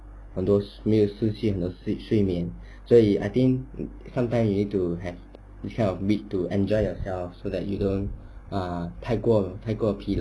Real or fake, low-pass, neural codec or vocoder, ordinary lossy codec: real; none; none; none